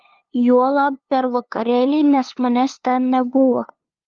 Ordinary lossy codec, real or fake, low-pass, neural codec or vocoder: Opus, 32 kbps; fake; 7.2 kHz; codec, 16 kHz, 2 kbps, FunCodec, trained on LibriTTS, 25 frames a second